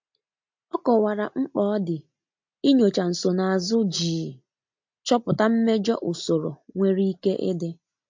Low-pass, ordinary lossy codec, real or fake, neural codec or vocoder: 7.2 kHz; MP3, 48 kbps; real; none